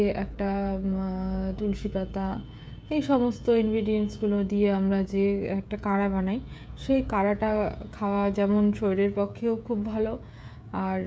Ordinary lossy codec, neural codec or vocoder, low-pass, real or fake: none; codec, 16 kHz, 16 kbps, FreqCodec, smaller model; none; fake